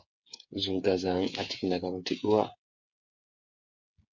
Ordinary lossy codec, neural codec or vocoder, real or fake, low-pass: MP3, 48 kbps; codec, 16 kHz, 8 kbps, FreqCodec, smaller model; fake; 7.2 kHz